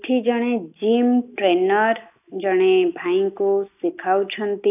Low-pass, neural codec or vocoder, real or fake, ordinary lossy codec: 3.6 kHz; none; real; none